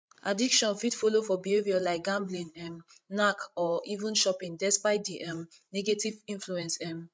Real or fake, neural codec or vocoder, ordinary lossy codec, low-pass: fake; codec, 16 kHz, 16 kbps, FreqCodec, larger model; none; none